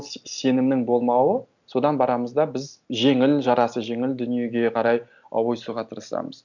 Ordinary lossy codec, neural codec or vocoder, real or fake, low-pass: none; none; real; 7.2 kHz